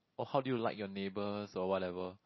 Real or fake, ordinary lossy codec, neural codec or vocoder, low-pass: real; MP3, 24 kbps; none; 7.2 kHz